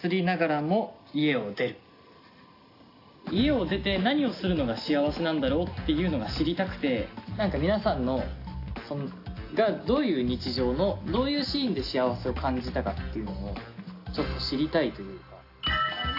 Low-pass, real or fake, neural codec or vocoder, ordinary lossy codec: 5.4 kHz; real; none; AAC, 32 kbps